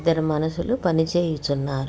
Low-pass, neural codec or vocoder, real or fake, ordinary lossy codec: none; none; real; none